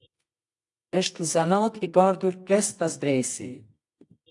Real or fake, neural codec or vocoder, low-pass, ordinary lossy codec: fake; codec, 24 kHz, 0.9 kbps, WavTokenizer, medium music audio release; 10.8 kHz; AAC, 64 kbps